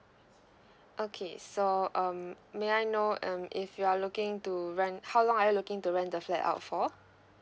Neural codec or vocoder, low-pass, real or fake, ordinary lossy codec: none; none; real; none